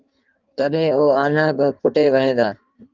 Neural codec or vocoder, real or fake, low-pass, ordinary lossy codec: codec, 16 kHz in and 24 kHz out, 1.1 kbps, FireRedTTS-2 codec; fake; 7.2 kHz; Opus, 24 kbps